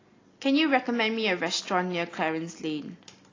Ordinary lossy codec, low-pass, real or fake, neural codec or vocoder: AAC, 32 kbps; 7.2 kHz; real; none